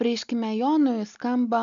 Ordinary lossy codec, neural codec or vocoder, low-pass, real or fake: MP3, 96 kbps; none; 7.2 kHz; real